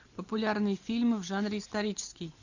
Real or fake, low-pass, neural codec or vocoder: real; 7.2 kHz; none